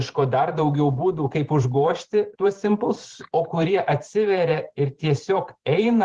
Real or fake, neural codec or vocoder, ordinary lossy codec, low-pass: fake; vocoder, 44.1 kHz, 128 mel bands every 512 samples, BigVGAN v2; Opus, 16 kbps; 10.8 kHz